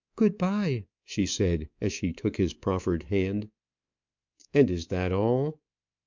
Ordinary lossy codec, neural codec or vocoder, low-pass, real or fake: MP3, 64 kbps; codec, 24 kHz, 3.1 kbps, DualCodec; 7.2 kHz; fake